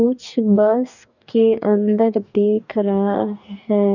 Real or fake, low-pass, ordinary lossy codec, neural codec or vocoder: fake; 7.2 kHz; none; codec, 44.1 kHz, 2.6 kbps, DAC